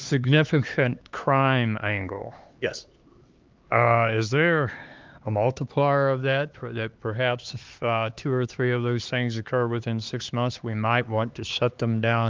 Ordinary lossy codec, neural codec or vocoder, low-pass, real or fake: Opus, 32 kbps; codec, 16 kHz, 4 kbps, X-Codec, HuBERT features, trained on LibriSpeech; 7.2 kHz; fake